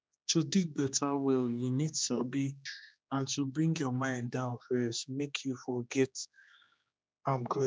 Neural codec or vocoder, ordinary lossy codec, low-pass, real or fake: codec, 16 kHz, 2 kbps, X-Codec, HuBERT features, trained on general audio; none; none; fake